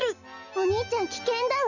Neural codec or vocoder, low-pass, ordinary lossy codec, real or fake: none; 7.2 kHz; none; real